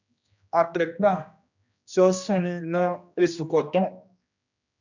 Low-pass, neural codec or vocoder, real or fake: 7.2 kHz; codec, 16 kHz, 1 kbps, X-Codec, HuBERT features, trained on general audio; fake